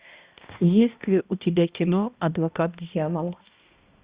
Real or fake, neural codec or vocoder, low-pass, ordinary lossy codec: fake; codec, 16 kHz, 1 kbps, X-Codec, HuBERT features, trained on balanced general audio; 3.6 kHz; Opus, 64 kbps